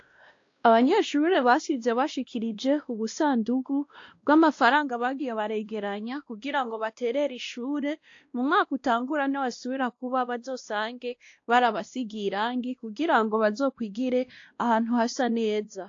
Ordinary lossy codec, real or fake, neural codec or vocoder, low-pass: AAC, 48 kbps; fake; codec, 16 kHz, 1 kbps, X-Codec, WavLM features, trained on Multilingual LibriSpeech; 7.2 kHz